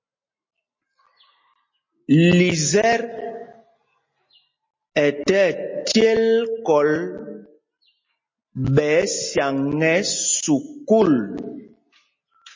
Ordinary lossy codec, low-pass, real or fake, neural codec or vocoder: MP3, 32 kbps; 7.2 kHz; real; none